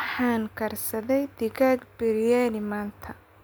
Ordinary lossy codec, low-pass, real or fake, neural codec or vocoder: none; none; real; none